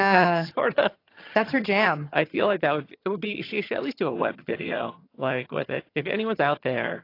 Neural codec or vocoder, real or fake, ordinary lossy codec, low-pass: vocoder, 22.05 kHz, 80 mel bands, HiFi-GAN; fake; AAC, 32 kbps; 5.4 kHz